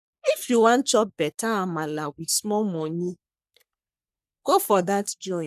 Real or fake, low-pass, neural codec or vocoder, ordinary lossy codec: fake; 14.4 kHz; codec, 44.1 kHz, 3.4 kbps, Pupu-Codec; none